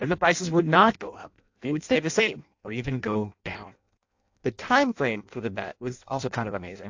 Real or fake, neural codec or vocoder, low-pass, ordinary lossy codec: fake; codec, 16 kHz in and 24 kHz out, 0.6 kbps, FireRedTTS-2 codec; 7.2 kHz; AAC, 48 kbps